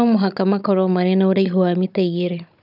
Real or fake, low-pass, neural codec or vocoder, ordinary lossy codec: fake; 5.4 kHz; codec, 16 kHz, 16 kbps, FunCodec, trained on Chinese and English, 50 frames a second; none